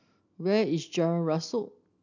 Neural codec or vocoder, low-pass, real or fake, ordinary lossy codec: none; 7.2 kHz; real; none